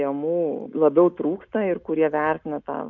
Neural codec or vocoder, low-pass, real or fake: none; 7.2 kHz; real